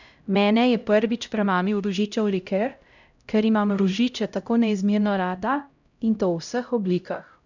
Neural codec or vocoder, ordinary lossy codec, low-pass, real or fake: codec, 16 kHz, 0.5 kbps, X-Codec, HuBERT features, trained on LibriSpeech; none; 7.2 kHz; fake